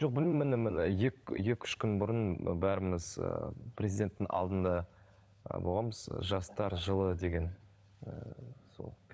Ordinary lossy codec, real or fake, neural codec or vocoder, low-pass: none; fake; codec, 16 kHz, 16 kbps, FunCodec, trained on LibriTTS, 50 frames a second; none